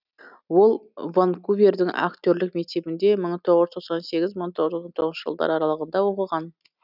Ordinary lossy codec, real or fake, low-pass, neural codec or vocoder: none; real; 5.4 kHz; none